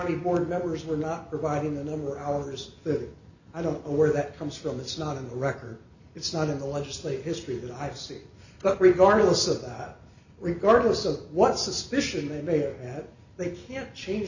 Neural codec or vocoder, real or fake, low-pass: none; real; 7.2 kHz